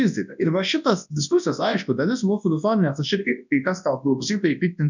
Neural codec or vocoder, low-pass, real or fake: codec, 24 kHz, 0.9 kbps, WavTokenizer, large speech release; 7.2 kHz; fake